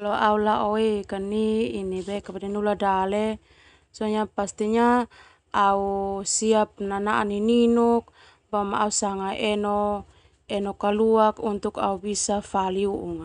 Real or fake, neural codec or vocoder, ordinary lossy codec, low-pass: real; none; none; 9.9 kHz